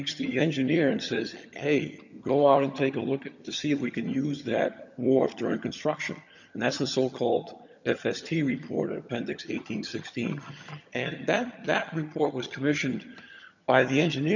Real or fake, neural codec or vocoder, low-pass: fake; vocoder, 22.05 kHz, 80 mel bands, HiFi-GAN; 7.2 kHz